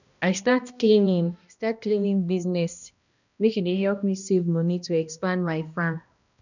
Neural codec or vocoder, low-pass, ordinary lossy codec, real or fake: codec, 16 kHz, 1 kbps, X-Codec, HuBERT features, trained on balanced general audio; 7.2 kHz; none; fake